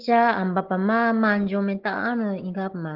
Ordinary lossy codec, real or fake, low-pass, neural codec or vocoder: Opus, 16 kbps; real; 5.4 kHz; none